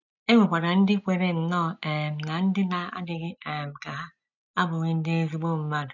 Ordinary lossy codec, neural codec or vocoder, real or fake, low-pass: none; none; real; 7.2 kHz